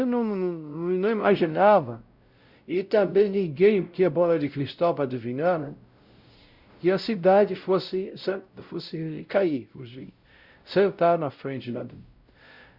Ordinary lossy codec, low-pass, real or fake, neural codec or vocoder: Opus, 64 kbps; 5.4 kHz; fake; codec, 16 kHz, 0.5 kbps, X-Codec, WavLM features, trained on Multilingual LibriSpeech